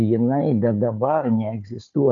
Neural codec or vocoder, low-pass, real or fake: codec, 16 kHz, 4 kbps, FunCodec, trained on Chinese and English, 50 frames a second; 7.2 kHz; fake